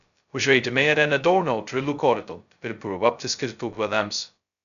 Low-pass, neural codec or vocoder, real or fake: 7.2 kHz; codec, 16 kHz, 0.2 kbps, FocalCodec; fake